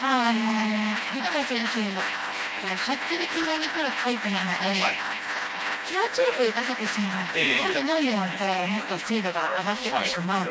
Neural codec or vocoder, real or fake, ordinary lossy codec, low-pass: codec, 16 kHz, 1 kbps, FreqCodec, smaller model; fake; none; none